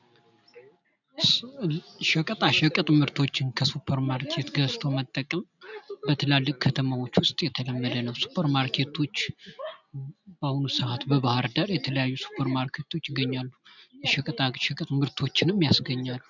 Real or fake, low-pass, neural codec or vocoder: real; 7.2 kHz; none